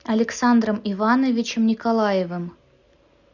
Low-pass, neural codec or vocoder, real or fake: 7.2 kHz; none; real